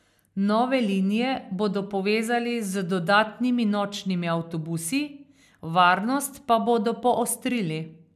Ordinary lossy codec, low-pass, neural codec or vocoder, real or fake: none; 14.4 kHz; none; real